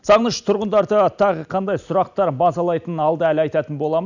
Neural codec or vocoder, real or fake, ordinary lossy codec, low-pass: none; real; none; 7.2 kHz